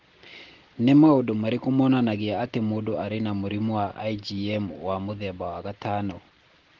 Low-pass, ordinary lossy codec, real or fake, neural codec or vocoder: 7.2 kHz; Opus, 16 kbps; real; none